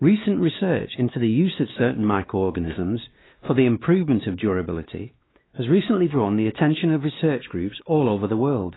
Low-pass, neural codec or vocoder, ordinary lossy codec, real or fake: 7.2 kHz; codec, 16 kHz, 2 kbps, X-Codec, WavLM features, trained on Multilingual LibriSpeech; AAC, 16 kbps; fake